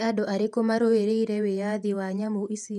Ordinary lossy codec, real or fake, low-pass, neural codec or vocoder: MP3, 96 kbps; fake; 14.4 kHz; vocoder, 48 kHz, 128 mel bands, Vocos